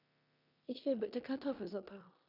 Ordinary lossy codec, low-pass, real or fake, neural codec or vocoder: none; 5.4 kHz; fake; codec, 16 kHz in and 24 kHz out, 0.9 kbps, LongCat-Audio-Codec, four codebook decoder